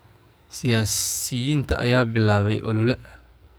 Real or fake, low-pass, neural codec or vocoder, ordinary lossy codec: fake; none; codec, 44.1 kHz, 2.6 kbps, SNAC; none